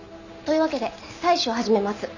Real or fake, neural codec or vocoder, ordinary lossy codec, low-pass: real; none; none; 7.2 kHz